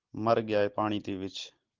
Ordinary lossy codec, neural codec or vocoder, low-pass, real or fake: Opus, 16 kbps; none; 7.2 kHz; real